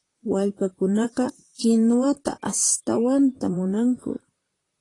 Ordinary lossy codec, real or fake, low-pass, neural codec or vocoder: AAC, 32 kbps; fake; 10.8 kHz; vocoder, 44.1 kHz, 128 mel bands, Pupu-Vocoder